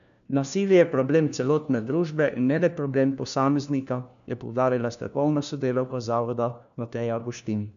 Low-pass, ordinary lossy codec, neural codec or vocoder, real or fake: 7.2 kHz; none; codec, 16 kHz, 1 kbps, FunCodec, trained on LibriTTS, 50 frames a second; fake